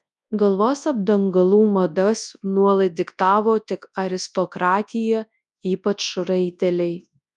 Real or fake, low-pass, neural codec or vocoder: fake; 10.8 kHz; codec, 24 kHz, 0.9 kbps, WavTokenizer, large speech release